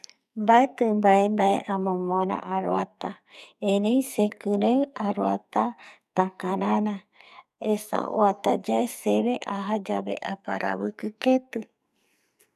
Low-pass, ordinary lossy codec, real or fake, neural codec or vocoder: 14.4 kHz; none; fake; codec, 44.1 kHz, 2.6 kbps, SNAC